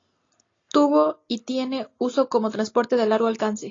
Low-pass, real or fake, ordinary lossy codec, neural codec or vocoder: 7.2 kHz; real; AAC, 32 kbps; none